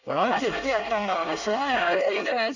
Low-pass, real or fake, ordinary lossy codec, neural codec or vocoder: 7.2 kHz; fake; none; codec, 24 kHz, 1 kbps, SNAC